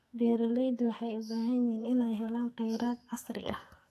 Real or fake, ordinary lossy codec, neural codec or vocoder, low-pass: fake; AAC, 64 kbps; codec, 44.1 kHz, 2.6 kbps, SNAC; 14.4 kHz